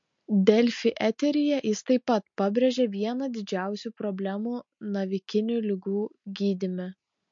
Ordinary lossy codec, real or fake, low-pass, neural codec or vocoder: MP3, 48 kbps; real; 7.2 kHz; none